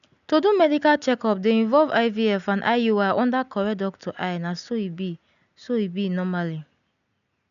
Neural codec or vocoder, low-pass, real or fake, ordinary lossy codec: none; 7.2 kHz; real; none